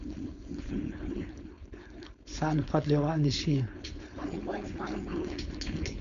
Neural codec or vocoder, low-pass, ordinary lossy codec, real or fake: codec, 16 kHz, 4.8 kbps, FACodec; 7.2 kHz; MP3, 64 kbps; fake